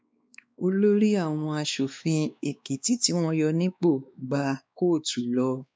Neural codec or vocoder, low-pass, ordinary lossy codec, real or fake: codec, 16 kHz, 2 kbps, X-Codec, WavLM features, trained on Multilingual LibriSpeech; none; none; fake